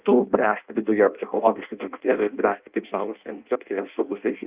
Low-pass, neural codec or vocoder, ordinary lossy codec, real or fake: 3.6 kHz; codec, 16 kHz in and 24 kHz out, 0.6 kbps, FireRedTTS-2 codec; Opus, 24 kbps; fake